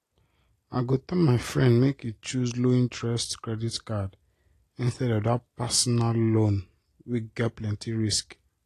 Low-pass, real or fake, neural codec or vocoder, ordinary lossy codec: 14.4 kHz; real; none; AAC, 48 kbps